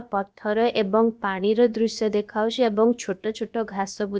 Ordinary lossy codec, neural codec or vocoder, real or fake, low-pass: none; codec, 16 kHz, about 1 kbps, DyCAST, with the encoder's durations; fake; none